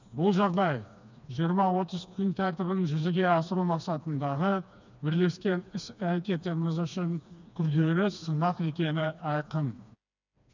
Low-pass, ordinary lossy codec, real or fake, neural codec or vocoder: 7.2 kHz; none; fake; codec, 16 kHz, 2 kbps, FreqCodec, smaller model